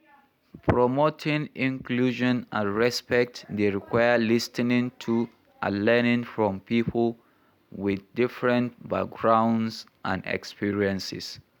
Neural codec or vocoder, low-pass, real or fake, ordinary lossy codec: none; 19.8 kHz; real; none